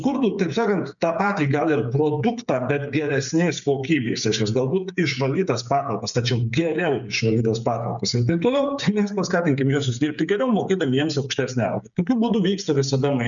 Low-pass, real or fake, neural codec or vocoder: 7.2 kHz; fake; codec, 16 kHz, 8 kbps, FreqCodec, smaller model